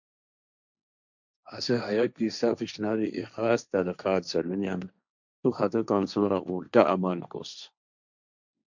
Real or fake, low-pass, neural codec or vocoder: fake; 7.2 kHz; codec, 16 kHz, 1.1 kbps, Voila-Tokenizer